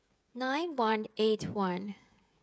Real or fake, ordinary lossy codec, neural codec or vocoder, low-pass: fake; none; codec, 16 kHz, 16 kbps, FreqCodec, smaller model; none